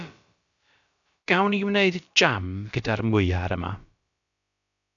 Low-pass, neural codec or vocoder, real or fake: 7.2 kHz; codec, 16 kHz, about 1 kbps, DyCAST, with the encoder's durations; fake